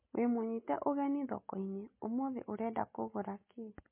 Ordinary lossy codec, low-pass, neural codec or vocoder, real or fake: MP3, 24 kbps; 3.6 kHz; none; real